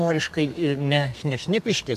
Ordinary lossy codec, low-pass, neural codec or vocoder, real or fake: MP3, 96 kbps; 14.4 kHz; codec, 32 kHz, 1.9 kbps, SNAC; fake